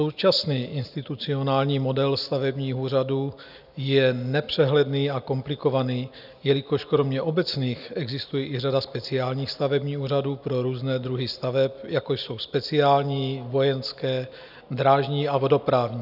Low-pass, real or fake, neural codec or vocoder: 5.4 kHz; real; none